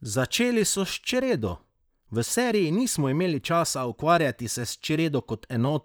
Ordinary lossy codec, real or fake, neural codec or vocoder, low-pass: none; fake; vocoder, 44.1 kHz, 128 mel bands, Pupu-Vocoder; none